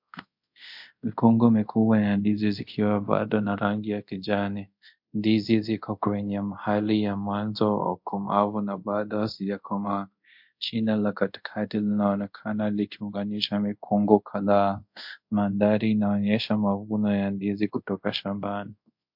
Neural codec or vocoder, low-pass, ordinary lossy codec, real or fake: codec, 24 kHz, 0.5 kbps, DualCodec; 5.4 kHz; MP3, 48 kbps; fake